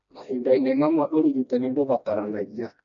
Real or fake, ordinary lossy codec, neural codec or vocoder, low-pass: fake; none; codec, 16 kHz, 1 kbps, FreqCodec, smaller model; 7.2 kHz